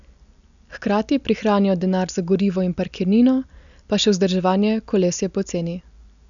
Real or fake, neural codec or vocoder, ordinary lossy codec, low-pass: real; none; none; 7.2 kHz